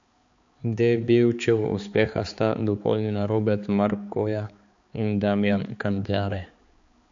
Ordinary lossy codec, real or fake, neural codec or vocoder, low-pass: MP3, 48 kbps; fake; codec, 16 kHz, 4 kbps, X-Codec, HuBERT features, trained on balanced general audio; 7.2 kHz